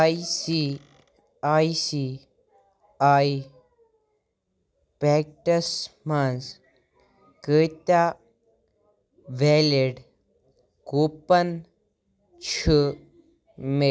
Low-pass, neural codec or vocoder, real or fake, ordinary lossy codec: none; none; real; none